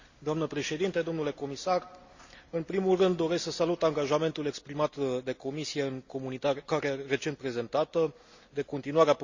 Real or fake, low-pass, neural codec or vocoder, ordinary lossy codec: real; 7.2 kHz; none; Opus, 64 kbps